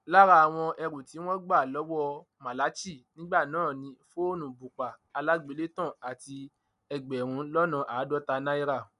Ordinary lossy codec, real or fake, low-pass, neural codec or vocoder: none; real; 10.8 kHz; none